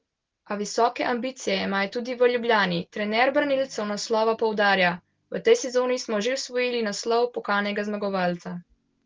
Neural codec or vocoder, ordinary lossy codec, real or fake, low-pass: none; Opus, 16 kbps; real; 7.2 kHz